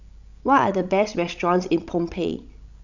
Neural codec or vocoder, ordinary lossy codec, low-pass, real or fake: codec, 16 kHz, 16 kbps, FreqCodec, larger model; none; 7.2 kHz; fake